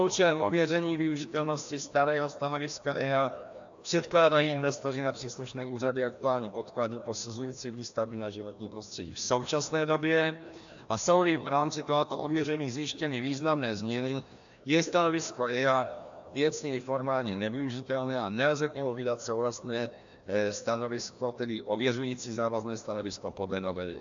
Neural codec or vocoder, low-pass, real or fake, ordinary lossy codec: codec, 16 kHz, 1 kbps, FreqCodec, larger model; 7.2 kHz; fake; AAC, 64 kbps